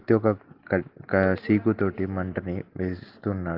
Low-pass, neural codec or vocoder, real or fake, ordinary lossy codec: 5.4 kHz; none; real; Opus, 16 kbps